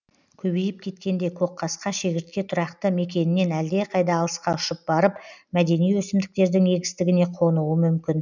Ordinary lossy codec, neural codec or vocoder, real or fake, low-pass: none; none; real; 7.2 kHz